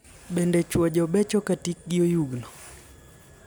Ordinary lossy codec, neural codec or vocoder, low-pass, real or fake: none; none; none; real